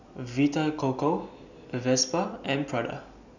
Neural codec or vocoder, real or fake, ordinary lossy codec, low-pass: none; real; none; 7.2 kHz